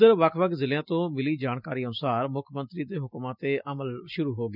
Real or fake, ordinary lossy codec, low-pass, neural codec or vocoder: real; none; 5.4 kHz; none